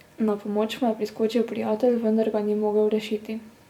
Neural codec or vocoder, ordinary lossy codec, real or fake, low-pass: vocoder, 44.1 kHz, 128 mel bands every 256 samples, BigVGAN v2; none; fake; 19.8 kHz